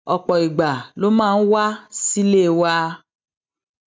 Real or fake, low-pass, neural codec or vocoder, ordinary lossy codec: real; none; none; none